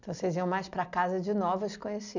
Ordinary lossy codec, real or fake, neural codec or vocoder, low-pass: none; real; none; 7.2 kHz